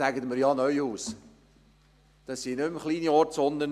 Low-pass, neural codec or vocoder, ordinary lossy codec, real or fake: 14.4 kHz; none; MP3, 96 kbps; real